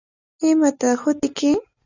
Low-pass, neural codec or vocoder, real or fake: 7.2 kHz; none; real